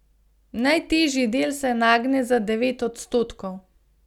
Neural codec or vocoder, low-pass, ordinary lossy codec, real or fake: none; 19.8 kHz; none; real